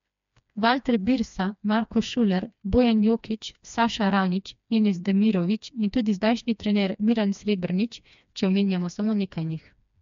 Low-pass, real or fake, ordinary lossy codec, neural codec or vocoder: 7.2 kHz; fake; MP3, 64 kbps; codec, 16 kHz, 2 kbps, FreqCodec, smaller model